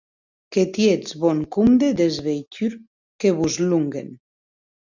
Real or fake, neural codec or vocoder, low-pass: real; none; 7.2 kHz